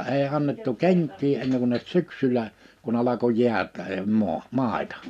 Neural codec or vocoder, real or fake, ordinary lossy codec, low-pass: none; real; none; 14.4 kHz